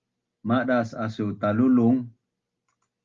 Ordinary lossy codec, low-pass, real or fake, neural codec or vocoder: Opus, 32 kbps; 7.2 kHz; real; none